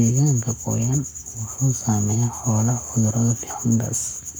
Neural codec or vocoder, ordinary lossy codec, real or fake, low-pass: codec, 44.1 kHz, 7.8 kbps, Pupu-Codec; none; fake; none